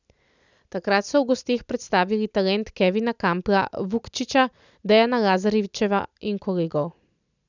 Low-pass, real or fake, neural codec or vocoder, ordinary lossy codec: 7.2 kHz; real; none; none